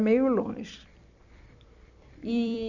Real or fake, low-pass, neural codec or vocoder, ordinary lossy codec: fake; 7.2 kHz; vocoder, 44.1 kHz, 128 mel bands every 256 samples, BigVGAN v2; none